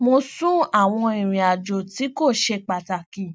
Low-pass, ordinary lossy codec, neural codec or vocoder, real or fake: none; none; none; real